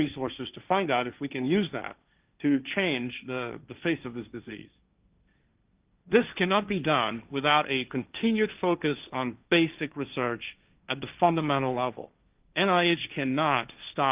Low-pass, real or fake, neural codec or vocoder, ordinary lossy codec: 3.6 kHz; fake; codec, 16 kHz, 1.1 kbps, Voila-Tokenizer; Opus, 24 kbps